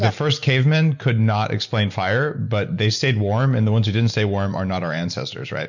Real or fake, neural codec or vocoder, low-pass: real; none; 7.2 kHz